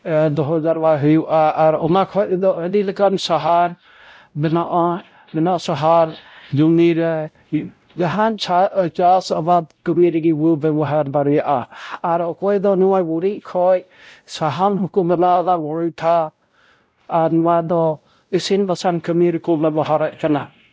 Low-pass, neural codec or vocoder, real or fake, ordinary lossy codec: none; codec, 16 kHz, 0.5 kbps, X-Codec, WavLM features, trained on Multilingual LibriSpeech; fake; none